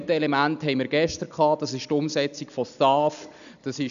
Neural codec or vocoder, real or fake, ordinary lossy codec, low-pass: none; real; none; 7.2 kHz